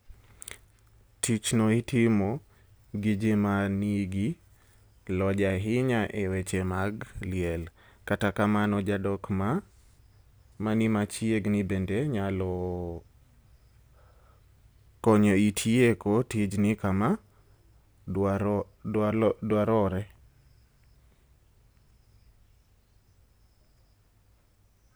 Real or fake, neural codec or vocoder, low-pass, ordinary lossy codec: real; none; none; none